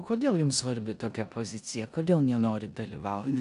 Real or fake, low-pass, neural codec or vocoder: fake; 10.8 kHz; codec, 16 kHz in and 24 kHz out, 0.9 kbps, LongCat-Audio-Codec, four codebook decoder